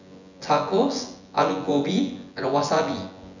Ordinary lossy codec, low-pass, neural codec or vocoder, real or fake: none; 7.2 kHz; vocoder, 24 kHz, 100 mel bands, Vocos; fake